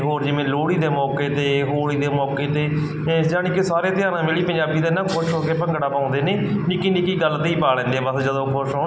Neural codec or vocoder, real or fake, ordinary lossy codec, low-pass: none; real; none; none